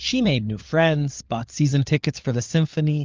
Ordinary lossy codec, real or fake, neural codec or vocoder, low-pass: Opus, 32 kbps; fake; codec, 16 kHz, 4 kbps, FunCodec, trained on LibriTTS, 50 frames a second; 7.2 kHz